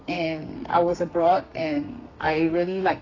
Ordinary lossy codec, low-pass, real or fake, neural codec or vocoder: AAC, 32 kbps; 7.2 kHz; fake; codec, 32 kHz, 1.9 kbps, SNAC